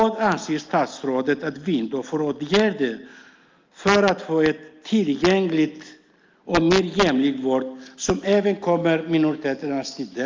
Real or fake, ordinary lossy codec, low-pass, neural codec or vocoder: real; Opus, 24 kbps; 7.2 kHz; none